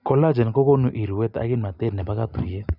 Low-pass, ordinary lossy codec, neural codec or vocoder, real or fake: 5.4 kHz; none; none; real